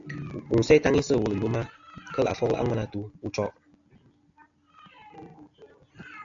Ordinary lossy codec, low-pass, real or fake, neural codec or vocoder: AAC, 64 kbps; 7.2 kHz; real; none